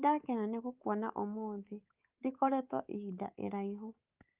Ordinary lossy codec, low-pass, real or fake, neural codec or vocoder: Opus, 32 kbps; 3.6 kHz; fake; codec, 24 kHz, 3.1 kbps, DualCodec